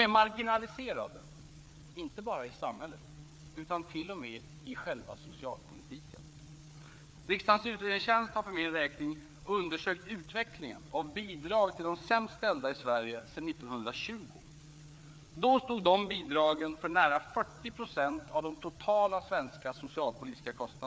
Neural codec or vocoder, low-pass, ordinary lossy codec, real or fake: codec, 16 kHz, 4 kbps, FreqCodec, larger model; none; none; fake